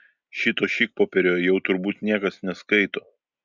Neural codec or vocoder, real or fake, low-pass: none; real; 7.2 kHz